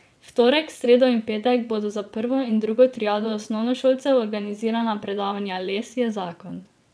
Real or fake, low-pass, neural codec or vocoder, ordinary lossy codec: fake; none; vocoder, 22.05 kHz, 80 mel bands, WaveNeXt; none